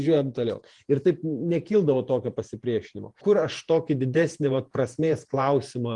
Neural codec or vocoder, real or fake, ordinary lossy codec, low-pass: vocoder, 48 kHz, 128 mel bands, Vocos; fake; Opus, 24 kbps; 10.8 kHz